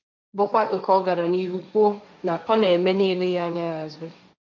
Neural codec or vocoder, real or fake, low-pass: codec, 16 kHz, 1.1 kbps, Voila-Tokenizer; fake; 7.2 kHz